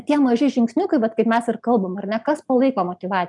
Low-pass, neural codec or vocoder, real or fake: 10.8 kHz; none; real